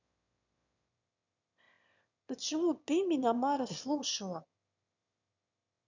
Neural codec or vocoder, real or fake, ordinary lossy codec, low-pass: autoencoder, 22.05 kHz, a latent of 192 numbers a frame, VITS, trained on one speaker; fake; none; 7.2 kHz